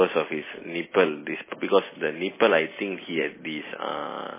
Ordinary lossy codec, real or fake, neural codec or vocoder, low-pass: MP3, 16 kbps; real; none; 3.6 kHz